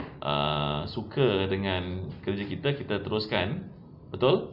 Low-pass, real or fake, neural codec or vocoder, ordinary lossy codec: 5.4 kHz; real; none; none